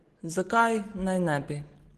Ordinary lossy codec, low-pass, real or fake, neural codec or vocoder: Opus, 16 kbps; 14.4 kHz; real; none